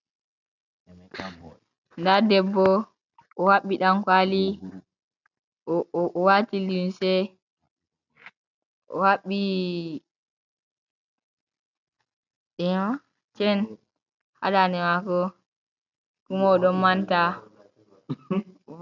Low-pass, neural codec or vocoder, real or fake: 7.2 kHz; none; real